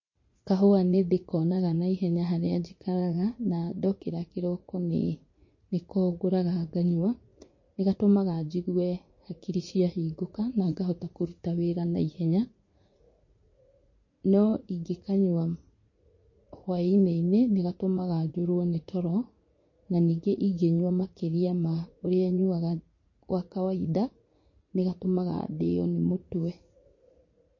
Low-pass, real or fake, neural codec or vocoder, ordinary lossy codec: 7.2 kHz; fake; vocoder, 44.1 kHz, 80 mel bands, Vocos; MP3, 32 kbps